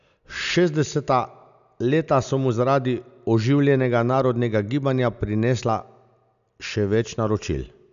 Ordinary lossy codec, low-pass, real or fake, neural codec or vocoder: none; 7.2 kHz; real; none